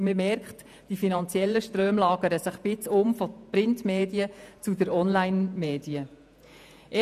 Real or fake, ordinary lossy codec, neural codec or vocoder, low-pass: fake; none; vocoder, 48 kHz, 128 mel bands, Vocos; 14.4 kHz